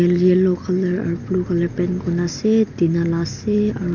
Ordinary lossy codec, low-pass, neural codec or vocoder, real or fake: none; 7.2 kHz; none; real